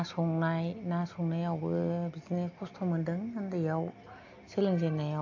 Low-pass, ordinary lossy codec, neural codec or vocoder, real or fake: 7.2 kHz; AAC, 48 kbps; none; real